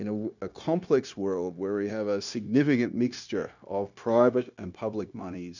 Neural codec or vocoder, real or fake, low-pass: codec, 16 kHz, 0.9 kbps, LongCat-Audio-Codec; fake; 7.2 kHz